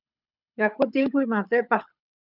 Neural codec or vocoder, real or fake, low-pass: codec, 24 kHz, 6 kbps, HILCodec; fake; 5.4 kHz